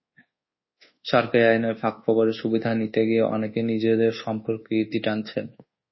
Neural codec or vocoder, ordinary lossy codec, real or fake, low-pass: codec, 24 kHz, 1.2 kbps, DualCodec; MP3, 24 kbps; fake; 7.2 kHz